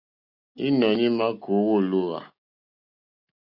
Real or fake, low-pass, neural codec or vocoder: real; 5.4 kHz; none